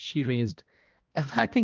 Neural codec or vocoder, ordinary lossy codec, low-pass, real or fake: codec, 16 kHz, 1 kbps, X-Codec, HuBERT features, trained on balanced general audio; Opus, 24 kbps; 7.2 kHz; fake